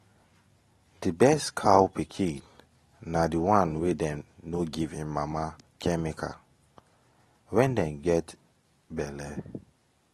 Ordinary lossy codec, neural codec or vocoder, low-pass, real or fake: AAC, 32 kbps; none; 19.8 kHz; real